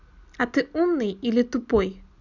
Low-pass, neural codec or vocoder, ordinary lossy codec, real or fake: 7.2 kHz; none; none; real